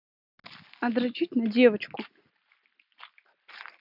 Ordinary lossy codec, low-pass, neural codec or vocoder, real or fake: none; 5.4 kHz; none; real